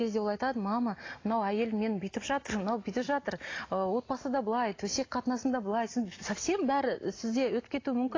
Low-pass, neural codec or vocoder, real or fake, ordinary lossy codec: 7.2 kHz; none; real; AAC, 32 kbps